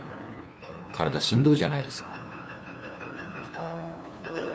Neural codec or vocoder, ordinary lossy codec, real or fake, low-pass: codec, 16 kHz, 2 kbps, FunCodec, trained on LibriTTS, 25 frames a second; none; fake; none